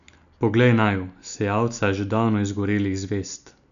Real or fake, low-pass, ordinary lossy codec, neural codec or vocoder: real; 7.2 kHz; none; none